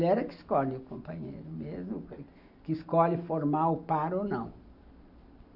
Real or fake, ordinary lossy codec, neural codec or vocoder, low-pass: real; none; none; 5.4 kHz